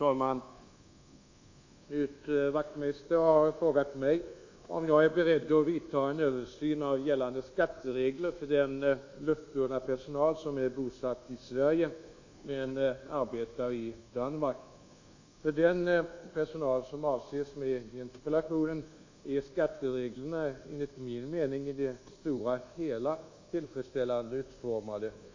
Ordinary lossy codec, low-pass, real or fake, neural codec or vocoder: AAC, 48 kbps; 7.2 kHz; fake; codec, 24 kHz, 1.2 kbps, DualCodec